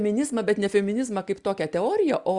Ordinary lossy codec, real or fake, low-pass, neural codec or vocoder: Opus, 64 kbps; real; 10.8 kHz; none